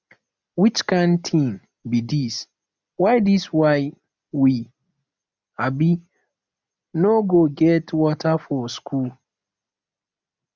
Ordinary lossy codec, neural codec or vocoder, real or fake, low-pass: none; none; real; 7.2 kHz